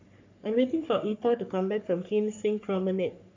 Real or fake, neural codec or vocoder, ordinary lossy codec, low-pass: fake; codec, 44.1 kHz, 3.4 kbps, Pupu-Codec; AAC, 48 kbps; 7.2 kHz